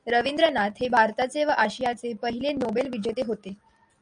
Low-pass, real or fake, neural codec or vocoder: 9.9 kHz; real; none